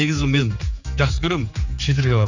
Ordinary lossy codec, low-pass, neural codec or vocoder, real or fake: none; 7.2 kHz; codec, 16 kHz, 4 kbps, X-Codec, HuBERT features, trained on general audio; fake